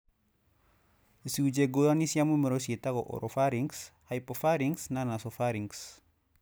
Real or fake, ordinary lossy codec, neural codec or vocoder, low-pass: real; none; none; none